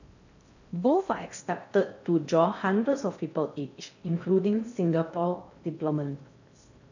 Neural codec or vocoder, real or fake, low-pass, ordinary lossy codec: codec, 16 kHz in and 24 kHz out, 0.6 kbps, FocalCodec, streaming, 4096 codes; fake; 7.2 kHz; none